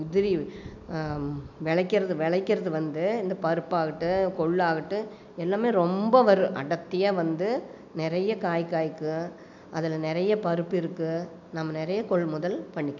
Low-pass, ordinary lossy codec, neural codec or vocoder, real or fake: 7.2 kHz; none; none; real